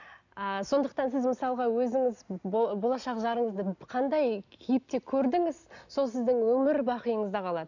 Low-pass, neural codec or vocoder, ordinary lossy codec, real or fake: 7.2 kHz; none; none; real